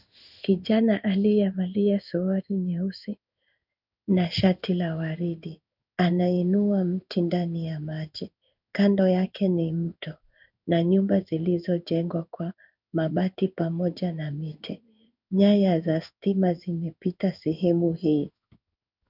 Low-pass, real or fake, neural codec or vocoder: 5.4 kHz; fake; codec, 16 kHz in and 24 kHz out, 1 kbps, XY-Tokenizer